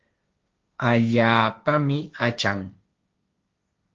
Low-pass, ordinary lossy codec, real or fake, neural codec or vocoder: 7.2 kHz; Opus, 32 kbps; fake; codec, 16 kHz, 1.1 kbps, Voila-Tokenizer